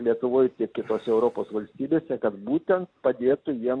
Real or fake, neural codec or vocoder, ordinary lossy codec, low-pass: real; none; AAC, 48 kbps; 9.9 kHz